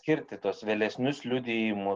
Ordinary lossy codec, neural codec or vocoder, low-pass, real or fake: Opus, 24 kbps; none; 7.2 kHz; real